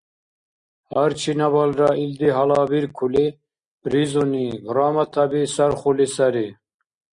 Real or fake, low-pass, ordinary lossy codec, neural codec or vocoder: real; 9.9 kHz; Opus, 64 kbps; none